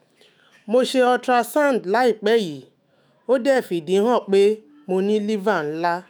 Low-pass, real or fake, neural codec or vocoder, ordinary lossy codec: none; fake; autoencoder, 48 kHz, 128 numbers a frame, DAC-VAE, trained on Japanese speech; none